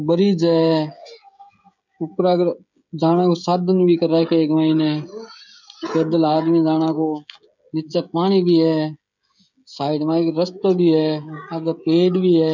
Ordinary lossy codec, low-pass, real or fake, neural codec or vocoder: none; 7.2 kHz; fake; codec, 16 kHz, 16 kbps, FreqCodec, smaller model